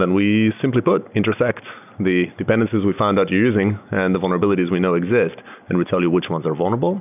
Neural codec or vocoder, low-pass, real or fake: none; 3.6 kHz; real